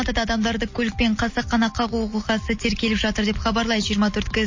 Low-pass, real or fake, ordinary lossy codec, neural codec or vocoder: 7.2 kHz; real; MP3, 32 kbps; none